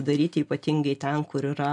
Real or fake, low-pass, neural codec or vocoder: real; 10.8 kHz; none